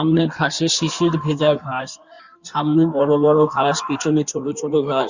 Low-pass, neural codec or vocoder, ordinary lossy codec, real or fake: 7.2 kHz; codec, 16 kHz in and 24 kHz out, 1.1 kbps, FireRedTTS-2 codec; Opus, 64 kbps; fake